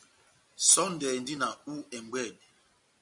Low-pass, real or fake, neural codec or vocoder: 10.8 kHz; real; none